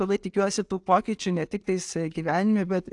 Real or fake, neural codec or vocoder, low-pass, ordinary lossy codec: real; none; 10.8 kHz; AAC, 64 kbps